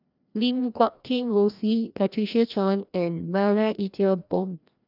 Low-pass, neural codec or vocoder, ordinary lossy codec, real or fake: 5.4 kHz; codec, 16 kHz, 1 kbps, FreqCodec, larger model; none; fake